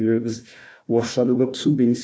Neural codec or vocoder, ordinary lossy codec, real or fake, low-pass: codec, 16 kHz, 1 kbps, FunCodec, trained on Chinese and English, 50 frames a second; none; fake; none